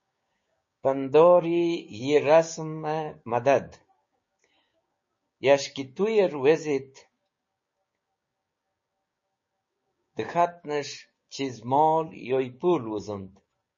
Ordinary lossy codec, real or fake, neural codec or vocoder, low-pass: MP3, 32 kbps; fake; codec, 16 kHz, 6 kbps, DAC; 7.2 kHz